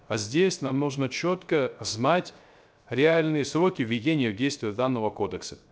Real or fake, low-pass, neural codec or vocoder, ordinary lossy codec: fake; none; codec, 16 kHz, 0.3 kbps, FocalCodec; none